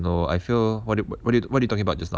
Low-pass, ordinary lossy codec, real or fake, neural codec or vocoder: none; none; real; none